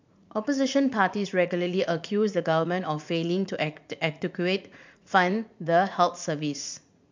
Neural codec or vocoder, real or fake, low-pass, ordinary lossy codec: vocoder, 44.1 kHz, 80 mel bands, Vocos; fake; 7.2 kHz; MP3, 64 kbps